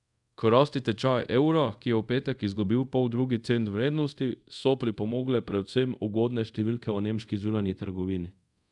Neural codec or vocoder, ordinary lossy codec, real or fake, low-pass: codec, 24 kHz, 0.5 kbps, DualCodec; none; fake; 10.8 kHz